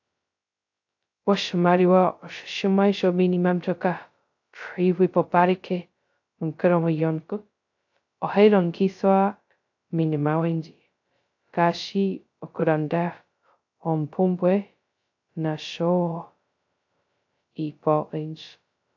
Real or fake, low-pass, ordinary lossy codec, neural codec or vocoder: fake; 7.2 kHz; AAC, 48 kbps; codec, 16 kHz, 0.2 kbps, FocalCodec